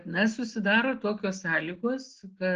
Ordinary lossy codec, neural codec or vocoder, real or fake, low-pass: Opus, 16 kbps; none; real; 7.2 kHz